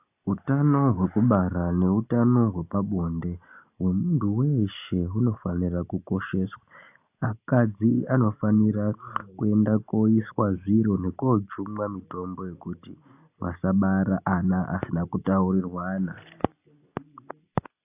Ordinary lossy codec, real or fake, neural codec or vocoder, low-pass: AAC, 32 kbps; real; none; 3.6 kHz